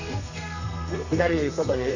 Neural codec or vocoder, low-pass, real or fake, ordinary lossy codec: codec, 32 kHz, 1.9 kbps, SNAC; 7.2 kHz; fake; none